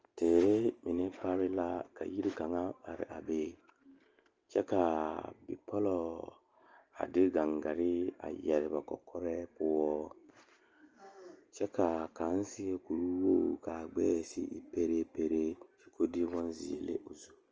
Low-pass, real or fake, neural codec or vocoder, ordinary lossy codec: 7.2 kHz; real; none; Opus, 24 kbps